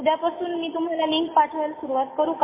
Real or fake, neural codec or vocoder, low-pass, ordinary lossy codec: real; none; 3.6 kHz; MP3, 16 kbps